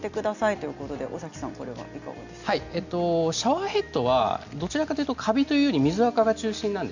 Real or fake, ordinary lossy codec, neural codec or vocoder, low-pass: real; none; none; 7.2 kHz